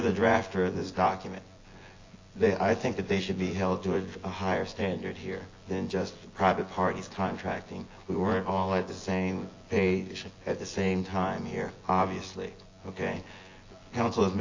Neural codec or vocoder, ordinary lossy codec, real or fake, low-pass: vocoder, 24 kHz, 100 mel bands, Vocos; AAC, 32 kbps; fake; 7.2 kHz